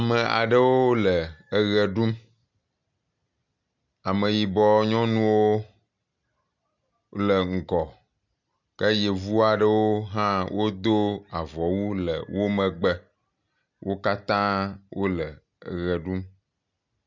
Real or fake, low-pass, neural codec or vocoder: real; 7.2 kHz; none